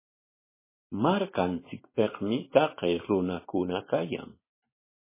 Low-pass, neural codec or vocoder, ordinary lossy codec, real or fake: 3.6 kHz; vocoder, 44.1 kHz, 128 mel bands every 512 samples, BigVGAN v2; MP3, 16 kbps; fake